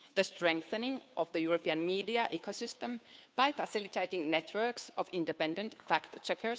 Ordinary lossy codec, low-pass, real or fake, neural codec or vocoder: none; none; fake; codec, 16 kHz, 2 kbps, FunCodec, trained on Chinese and English, 25 frames a second